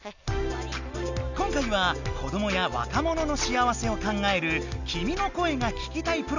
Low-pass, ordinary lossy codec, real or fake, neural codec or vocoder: 7.2 kHz; none; real; none